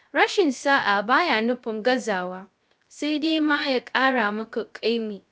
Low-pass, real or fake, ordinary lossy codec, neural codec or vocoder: none; fake; none; codec, 16 kHz, 0.3 kbps, FocalCodec